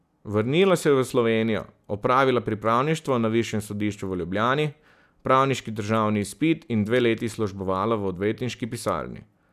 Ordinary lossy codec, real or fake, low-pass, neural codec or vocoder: none; real; 14.4 kHz; none